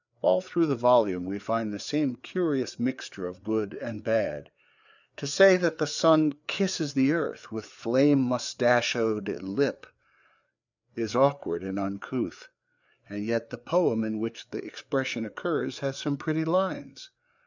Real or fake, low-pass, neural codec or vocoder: fake; 7.2 kHz; codec, 16 kHz, 4 kbps, FreqCodec, larger model